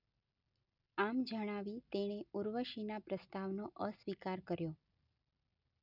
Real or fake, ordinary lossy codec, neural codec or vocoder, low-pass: real; none; none; 5.4 kHz